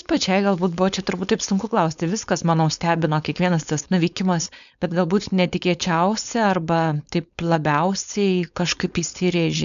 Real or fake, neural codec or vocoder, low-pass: fake; codec, 16 kHz, 4.8 kbps, FACodec; 7.2 kHz